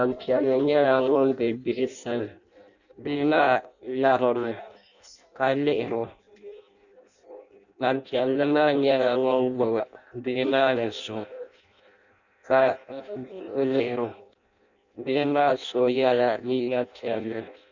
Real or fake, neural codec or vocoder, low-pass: fake; codec, 16 kHz in and 24 kHz out, 0.6 kbps, FireRedTTS-2 codec; 7.2 kHz